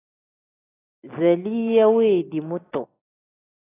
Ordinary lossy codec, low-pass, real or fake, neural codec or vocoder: AAC, 16 kbps; 3.6 kHz; real; none